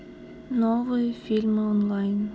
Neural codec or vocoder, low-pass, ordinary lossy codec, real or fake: none; none; none; real